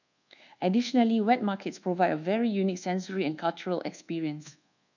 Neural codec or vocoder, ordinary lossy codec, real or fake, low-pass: codec, 24 kHz, 1.2 kbps, DualCodec; none; fake; 7.2 kHz